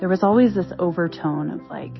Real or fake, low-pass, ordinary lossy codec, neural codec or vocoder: real; 7.2 kHz; MP3, 24 kbps; none